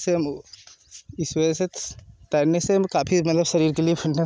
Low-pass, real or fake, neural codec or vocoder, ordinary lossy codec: none; real; none; none